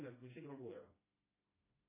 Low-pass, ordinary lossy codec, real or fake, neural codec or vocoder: 3.6 kHz; MP3, 16 kbps; fake; codec, 16 kHz, 1 kbps, FreqCodec, smaller model